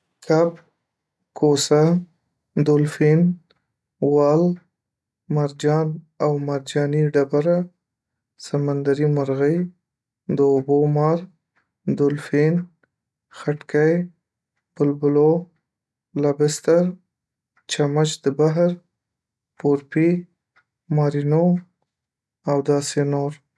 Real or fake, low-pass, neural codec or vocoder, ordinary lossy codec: real; none; none; none